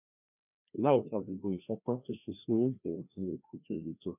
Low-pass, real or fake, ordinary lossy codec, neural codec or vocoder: 3.6 kHz; fake; none; codec, 16 kHz, 1 kbps, FreqCodec, larger model